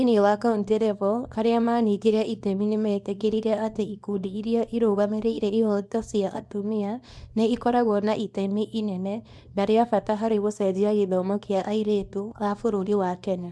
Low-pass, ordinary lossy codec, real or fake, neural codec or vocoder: none; none; fake; codec, 24 kHz, 0.9 kbps, WavTokenizer, small release